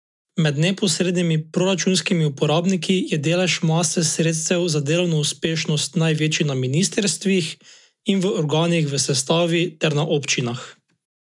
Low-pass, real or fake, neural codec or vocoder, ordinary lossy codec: 10.8 kHz; real; none; MP3, 96 kbps